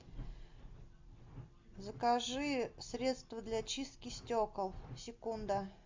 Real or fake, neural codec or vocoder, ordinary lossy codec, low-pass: real; none; MP3, 64 kbps; 7.2 kHz